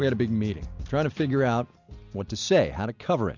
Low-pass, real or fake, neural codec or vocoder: 7.2 kHz; real; none